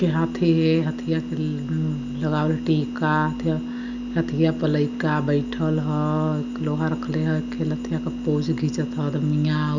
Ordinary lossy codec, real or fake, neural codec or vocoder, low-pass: none; real; none; 7.2 kHz